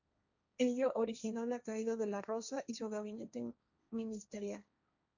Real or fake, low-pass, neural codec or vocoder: fake; 7.2 kHz; codec, 16 kHz, 1.1 kbps, Voila-Tokenizer